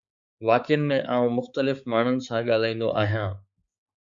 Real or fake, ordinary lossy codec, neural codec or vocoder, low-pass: fake; Opus, 64 kbps; codec, 16 kHz, 4 kbps, X-Codec, HuBERT features, trained on balanced general audio; 7.2 kHz